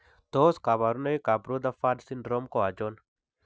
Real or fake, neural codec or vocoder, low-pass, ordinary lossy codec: real; none; none; none